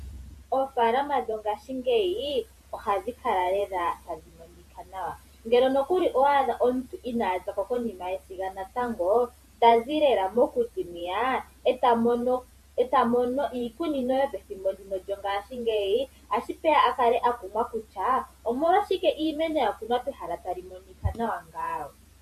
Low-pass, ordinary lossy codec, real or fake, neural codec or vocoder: 14.4 kHz; MP3, 64 kbps; fake; vocoder, 48 kHz, 128 mel bands, Vocos